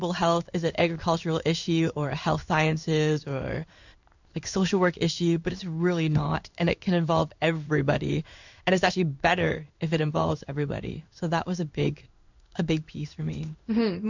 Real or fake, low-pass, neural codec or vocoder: fake; 7.2 kHz; codec, 16 kHz in and 24 kHz out, 1 kbps, XY-Tokenizer